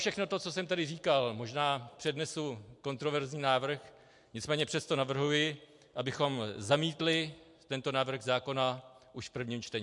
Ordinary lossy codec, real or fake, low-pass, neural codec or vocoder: MP3, 64 kbps; real; 10.8 kHz; none